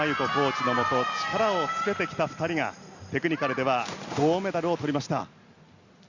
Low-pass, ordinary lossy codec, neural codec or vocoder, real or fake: 7.2 kHz; Opus, 64 kbps; none; real